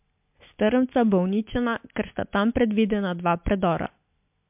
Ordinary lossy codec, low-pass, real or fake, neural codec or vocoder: MP3, 32 kbps; 3.6 kHz; real; none